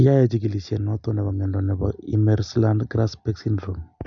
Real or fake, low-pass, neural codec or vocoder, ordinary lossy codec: real; 7.2 kHz; none; none